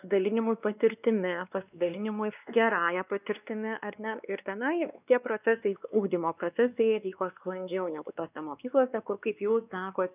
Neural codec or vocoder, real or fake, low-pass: codec, 16 kHz, 2 kbps, X-Codec, WavLM features, trained on Multilingual LibriSpeech; fake; 3.6 kHz